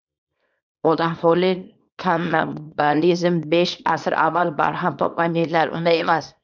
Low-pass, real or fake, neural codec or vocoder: 7.2 kHz; fake; codec, 24 kHz, 0.9 kbps, WavTokenizer, small release